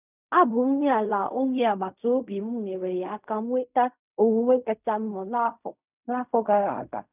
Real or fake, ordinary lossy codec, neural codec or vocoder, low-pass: fake; none; codec, 16 kHz in and 24 kHz out, 0.4 kbps, LongCat-Audio-Codec, fine tuned four codebook decoder; 3.6 kHz